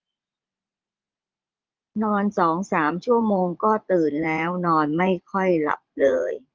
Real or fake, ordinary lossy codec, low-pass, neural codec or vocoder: fake; Opus, 32 kbps; 7.2 kHz; vocoder, 44.1 kHz, 80 mel bands, Vocos